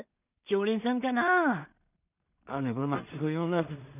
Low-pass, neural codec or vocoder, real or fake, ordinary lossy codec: 3.6 kHz; codec, 16 kHz in and 24 kHz out, 0.4 kbps, LongCat-Audio-Codec, two codebook decoder; fake; none